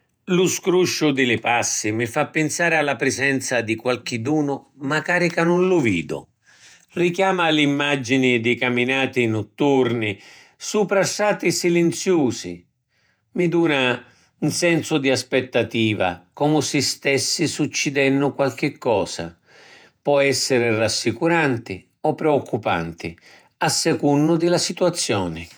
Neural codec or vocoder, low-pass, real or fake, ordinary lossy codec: vocoder, 48 kHz, 128 mel bands, Vocos; none; fake; none